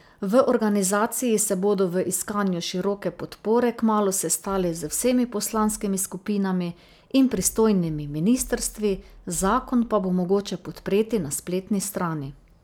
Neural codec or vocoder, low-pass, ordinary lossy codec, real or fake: none; none; none; real